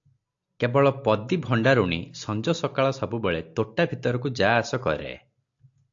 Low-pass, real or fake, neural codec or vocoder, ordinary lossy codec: 7.2 kHz; real; none; AAC, 64 kbps